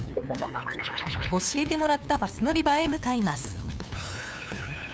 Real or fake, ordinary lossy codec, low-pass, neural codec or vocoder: fake; none; none; codec, 16 kHz, 2 kbps, FunCodec, trained on LibriTTS, 25 frames a second